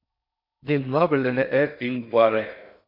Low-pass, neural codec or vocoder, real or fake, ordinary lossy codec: 5.4 kHz; codec, 16 kHz in and 24 kHz out, 0.6 kbps, FocalCodec, streaming, 4096 codes; fake; MP3, 48 kbps